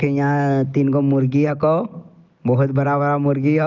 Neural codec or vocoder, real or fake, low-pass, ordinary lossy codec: none; real; 7.2 kHz; Opus, 24 kbps